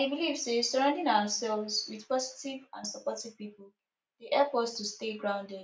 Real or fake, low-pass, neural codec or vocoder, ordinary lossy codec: real; none; none; none